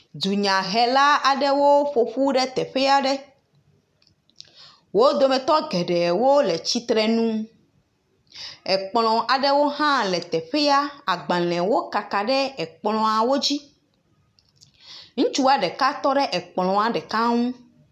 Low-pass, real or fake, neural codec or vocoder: 14.4 kHz; real; none